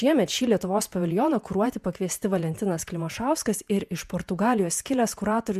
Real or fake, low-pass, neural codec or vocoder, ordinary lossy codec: fake; 14.4 kHz; vocoder, 48 kHz, 128 mel bands, Vocos; MP3, 96 kbps